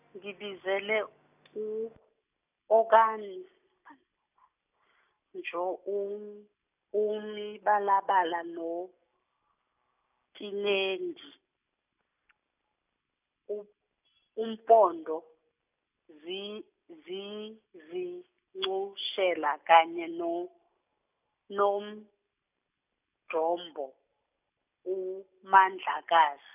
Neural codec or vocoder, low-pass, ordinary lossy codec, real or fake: none; 3.6 kHz; none; real